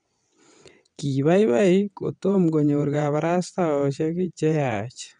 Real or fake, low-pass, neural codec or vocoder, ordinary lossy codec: fake; 9.9 kHz; vocoder, 22.05 kHz, 80 mel bands, WaveNeXt; none